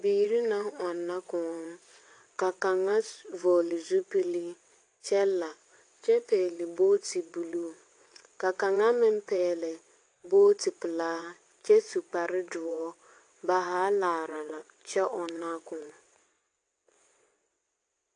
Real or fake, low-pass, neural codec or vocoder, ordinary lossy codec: fake; 9.9 kHz; vocoder, 22.05 kHz, 80 mel bands, WaveNeXt; AAC, 48 kbps